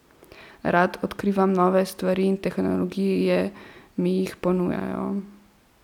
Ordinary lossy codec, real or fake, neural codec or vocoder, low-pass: none; real; none; 19.8 kHz